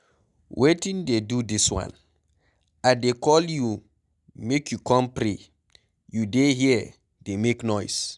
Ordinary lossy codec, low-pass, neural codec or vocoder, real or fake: none; 10.8 kHz; none; real